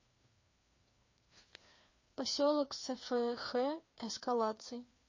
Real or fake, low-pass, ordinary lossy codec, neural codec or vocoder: fake; 7.2 kHz; MP3, 32 kbps; codec, 16 kHz, 2 kbps, FreqCodec, larger model